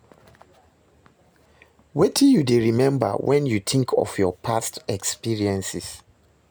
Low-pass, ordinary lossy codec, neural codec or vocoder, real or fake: none; none; none; real